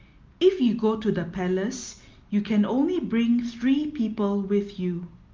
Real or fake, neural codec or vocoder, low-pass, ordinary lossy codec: real; none; 7.2 kHz; Opus, 24 kbps